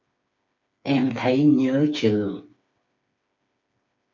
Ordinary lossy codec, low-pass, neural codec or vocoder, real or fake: MP3, 48 kbps; 7.2 kHz; codec, 16 kHz, 4 kbps, FreqCodec, smaller model; fake